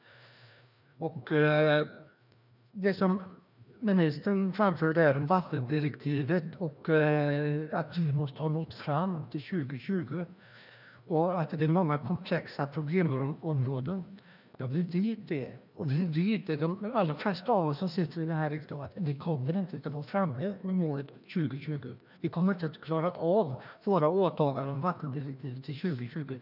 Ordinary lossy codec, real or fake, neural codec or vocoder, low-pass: none; fake; codec, 16 kHz, 1 kbps, FreqCodec, larger model; 5.4 kHz